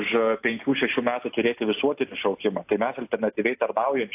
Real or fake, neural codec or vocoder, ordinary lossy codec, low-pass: real; none; AAC, 32 kbps; 3.6 kHz